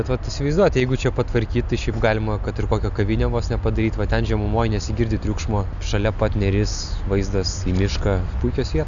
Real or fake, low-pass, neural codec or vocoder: real; 7.2 kHz; none